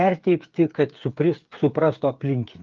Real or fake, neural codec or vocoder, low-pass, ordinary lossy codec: fake; codec, 16 kHz, 8 kbps, FreqCodec, smaller model; 7.2 kHz; Opus, 24 kbps